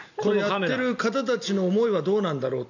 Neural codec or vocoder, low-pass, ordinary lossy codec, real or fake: none; 7.2 kHz; none; real